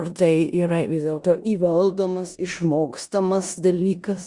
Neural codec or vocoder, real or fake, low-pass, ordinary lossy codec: codec, 16 kHz in and 24 kHz out, 0.9 kbps, LongCat-Audio-Codec, four codebook decoder; fake; 10.8 kHz; Opus, 64 kbps